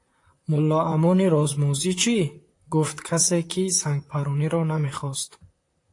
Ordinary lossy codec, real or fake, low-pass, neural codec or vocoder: AAC, 48 kbps; fake; 10.8 kHz; vocoder, 44.1 kHz, 128 mel bands, Pupu-Vocoder